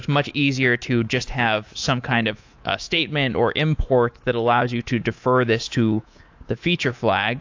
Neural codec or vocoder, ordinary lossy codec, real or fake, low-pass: codec, 24 kHz, 3.1 kbps, DualCodec; AAC, 48 kbps; fake; 7.2 kHz